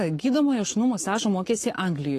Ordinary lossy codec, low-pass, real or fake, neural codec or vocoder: AAC, 48 kbps; 14.4 kHz; fake; codec, 44.1 kHz, 7.8 kbps, Pupu-Codec